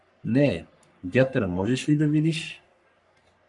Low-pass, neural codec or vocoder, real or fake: 10.8 kHz; codec, 44.1 kHz, 3.4 kbps, Pupu-Codec; fake